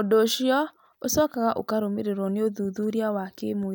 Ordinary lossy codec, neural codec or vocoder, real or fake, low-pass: none; none; real; none